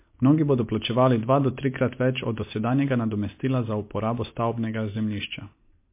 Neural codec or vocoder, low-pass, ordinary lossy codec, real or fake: none; 3.6 kHz; MP3, 24 kbps; real